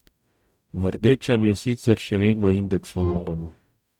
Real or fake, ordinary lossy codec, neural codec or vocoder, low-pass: fake; none; codec, 44.1 kHz, 0.9 kbps, DAC; 19.8 kHz